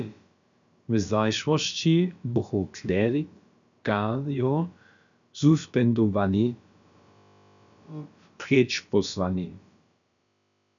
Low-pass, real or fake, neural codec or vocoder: 7.2 kHz; fake; codec, 16 kHz, about 1 kbps, DyCAST, with the encoder's durations